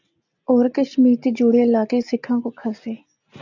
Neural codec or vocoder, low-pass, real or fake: none; 7.2 kHz; real